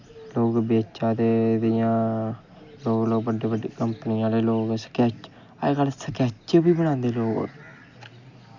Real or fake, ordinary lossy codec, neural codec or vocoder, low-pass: real; Opus, 64 kbps; none; 7.2 kHz